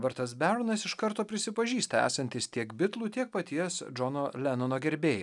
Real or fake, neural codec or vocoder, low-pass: real; none; 10.8 kHz